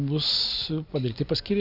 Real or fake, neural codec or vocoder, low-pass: real; none; 5.4 kHz